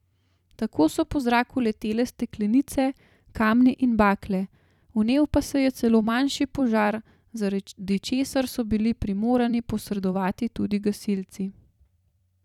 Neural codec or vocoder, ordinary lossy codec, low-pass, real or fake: vocoder, 44.1 kHz, 128 mel bands every 256 samples, BigVGAN v2; none; 19.8 kHz; fake